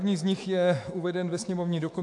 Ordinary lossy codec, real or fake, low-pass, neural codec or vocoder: MP3, 64 kbps; fake; 10.8 kHz; autoencoder, 48 kHz, 128 numbers a frame, DAC-VAE, trained on Japanese speech